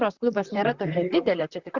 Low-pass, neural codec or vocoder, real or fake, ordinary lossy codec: 7.2 kHz; vocoder, 44.1 kHz, 128 mel bands, Pupu-Vocoder; fake; Opus, 64 kbps